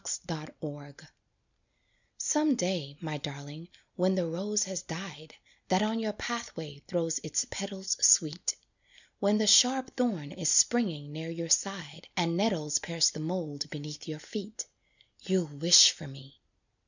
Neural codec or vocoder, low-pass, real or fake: none; 7.2 kHz; real